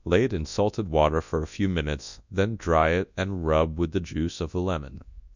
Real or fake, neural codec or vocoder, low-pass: fake; codec, 24 kHz, 0.9 kbps, WavTokenizer, large speech release; 7.2 kHz